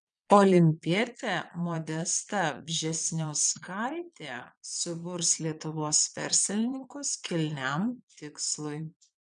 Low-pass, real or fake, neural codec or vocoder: 9.9 kHz; fake; vocoder, 22.05 kHz, 80 mel bands, Vocos